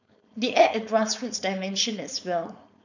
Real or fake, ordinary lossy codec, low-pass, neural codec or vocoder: fake; none; 7.2 kHz; codec, 16 kHz, 4.8 kbps, FACodec